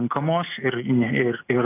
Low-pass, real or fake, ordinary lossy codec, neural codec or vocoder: 3.6 kHz; real; AAC, 24 kbps; none